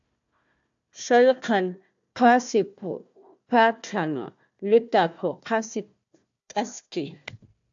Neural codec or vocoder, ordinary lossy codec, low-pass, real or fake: codec, 16 kHz, 1 kbps, FunCodec, trained on Chinese and English, 50 frames a second; AAC, 64 kbps; 7.2 kHz; fake